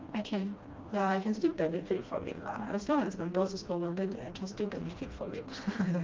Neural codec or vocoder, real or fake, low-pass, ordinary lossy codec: codec, 16 kHz, 1 kbps, FreqCodec, smaller model; fake; 7.2 kHz; Opus, 32 kbps